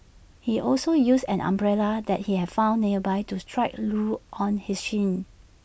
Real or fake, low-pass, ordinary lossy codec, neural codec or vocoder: real; none; none; none